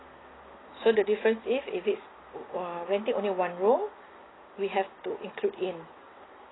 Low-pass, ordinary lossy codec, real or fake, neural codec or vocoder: 7.2 kHz; AAC, 16 kbps; real; none